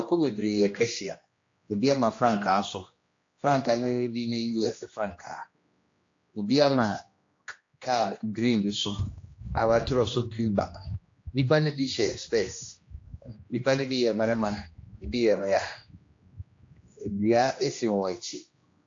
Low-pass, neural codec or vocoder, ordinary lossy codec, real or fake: 7.2 kHz; codec, 16 kHz, 1 kbps, X-Codec, HuBERT features, trained on general audio; AAC, 48 kbps; fake